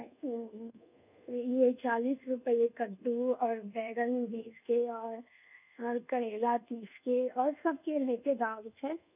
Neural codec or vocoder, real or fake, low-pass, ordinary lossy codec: codec, 24 kHz, 1.2 kbps, DualCodec; fake; 3.6 kHz; none